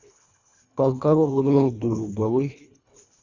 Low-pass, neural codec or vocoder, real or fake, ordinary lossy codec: 7.2 kHz; codec, 24 kHz, 1.5 kbps, HILCodec; fake; Opus, 64 kbps